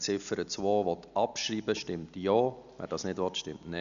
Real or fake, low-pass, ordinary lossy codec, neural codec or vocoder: real; 7.2 kHz; none; none